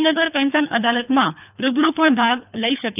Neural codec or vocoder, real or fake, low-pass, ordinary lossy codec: codec, 24 kHz, 3 kbps, HILCodec; fake; 3.6 kHz; none